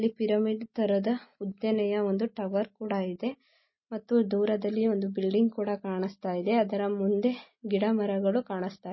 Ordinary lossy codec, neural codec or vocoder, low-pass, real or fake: MP3, 24 kbps; none; 7.2 kHz; real